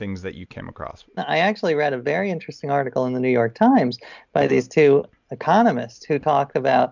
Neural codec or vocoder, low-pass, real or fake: none; 7.2 kHz; real